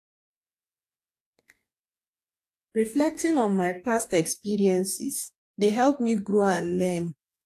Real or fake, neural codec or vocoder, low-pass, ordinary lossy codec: fake; codec, 44.1 kHz, 2.6 kbps, DAC; 14.4 kHz; AAC, 64 kbps